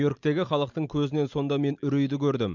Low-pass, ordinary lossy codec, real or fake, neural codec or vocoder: 7.2 kHz; none; real; none